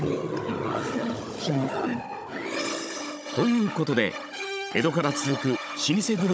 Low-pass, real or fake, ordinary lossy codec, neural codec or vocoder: none; fake; none; codec, 16 kHz, 16 kbps, FunCodec, trained on Chinese and English, 50 frames a second